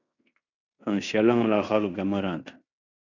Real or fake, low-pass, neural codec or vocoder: fake; 7.2 kHz; codec, 16 kHz in and 24 kHz out, 0.9 kbps, LongCat-Audio-Codec, fine tuned four codebook decoder